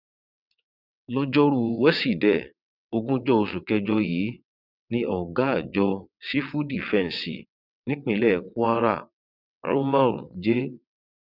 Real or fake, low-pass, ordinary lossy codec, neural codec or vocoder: fake; 5.4 kHz; none; vocoder, 22.05 kHz, 80 mel bands, WaveNeXt